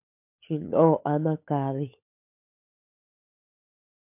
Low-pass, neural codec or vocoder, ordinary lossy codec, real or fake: 3.6 kHz; codec, 16 kHz, 16 kbps, FunCodec, trained on LibriTTS, 50 frames a second; MP3, 32 kbps; fake